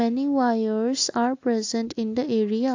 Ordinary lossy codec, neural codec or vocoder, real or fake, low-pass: AAC, 48 kbps; none; real; 7.2 kHz